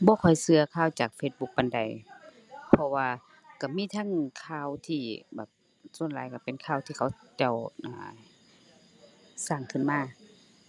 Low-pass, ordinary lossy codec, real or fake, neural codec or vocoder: none; none; real; none